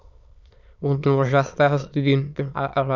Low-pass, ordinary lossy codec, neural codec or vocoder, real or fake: 7.2 kHz; none; autoencoder, 22.05 kHz, a latent of 192 numbers a frame, VITS, trained on many speakers; fake